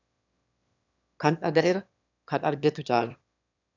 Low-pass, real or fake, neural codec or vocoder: 7.2 kHz; fake; autoencoder, 22.05 kHz, a latent of 192 numbers a frame, VITS, trained on one speaker